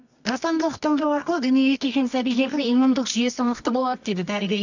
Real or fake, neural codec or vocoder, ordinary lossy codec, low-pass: fake; codec, 24 kHz, 0.9 kbps, WavTokenizer, medium music audio release; none; 7.2 kHz